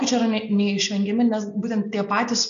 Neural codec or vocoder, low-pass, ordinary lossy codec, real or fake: none; 7.2 kHz; AAC, 96 kbps; real